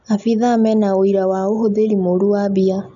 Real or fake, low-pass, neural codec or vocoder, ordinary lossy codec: real; 7.2 kHz; none; none